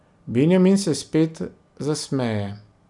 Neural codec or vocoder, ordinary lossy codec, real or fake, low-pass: none; none; real; 10.8 kHz